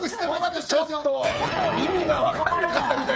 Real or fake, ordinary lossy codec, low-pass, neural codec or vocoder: fake; none; none; codec, 16 kHz, 8 kbps, FreqCodec, smaller model